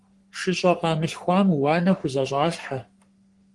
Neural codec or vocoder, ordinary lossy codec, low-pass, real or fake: codec, 44.1 kHz, 3.4 kbps, Pupu-Codec; Opus, 24 kbps; 10.8 kHz; fake